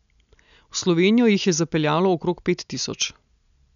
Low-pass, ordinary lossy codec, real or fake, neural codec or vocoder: 7.2 kHz; none; real; none